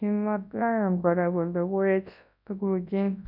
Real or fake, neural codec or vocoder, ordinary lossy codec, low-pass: fake; codec, 24 kHz, 0.9 kbps, WavTokenizer, large speech release; none; 5.4 kHz